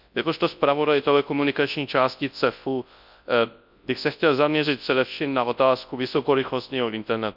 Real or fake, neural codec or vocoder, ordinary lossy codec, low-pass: fake; codec, 24 kHz, 0.9 kbps, WavTokenizer, large speech release; none; 5.4 kHz